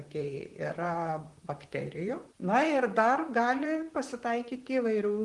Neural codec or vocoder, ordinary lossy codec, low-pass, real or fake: vocoder, 44.1 kHz, 128 mel bands, Pupu-Vocoder; Opus, 24 kbps; 10.8 kHz; fake